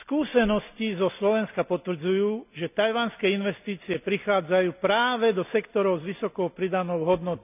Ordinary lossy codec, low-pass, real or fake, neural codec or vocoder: none; 3.6 kHz; real; none